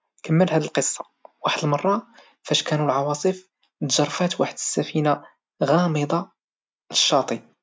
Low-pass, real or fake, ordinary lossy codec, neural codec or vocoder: none; real; none; none